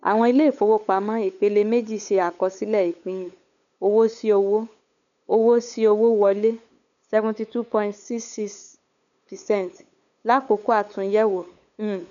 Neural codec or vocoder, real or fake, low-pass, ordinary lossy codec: codec, 16 kHz, 8 kbps, FunCodec, trained on LibriTTS, 25 frames a second; fake; 7.2 kHz; none